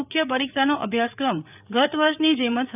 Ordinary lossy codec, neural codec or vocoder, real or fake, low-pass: none; codec, 16 kHz, 8 kbps, FreqCodec, larger model; fake; 3.6 kHz